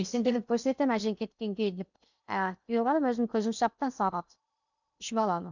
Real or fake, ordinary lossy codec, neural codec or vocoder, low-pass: fake; none; codec, 16 kHz in and 24 kHz out, 0.8 kbps, FocalCodec, streaming, 65536 codes; 7.2 kHz